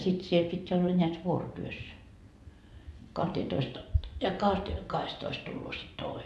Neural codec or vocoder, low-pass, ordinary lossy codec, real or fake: vocoder, 24 kHz, 100 mel bands, Vocos; none; none; fake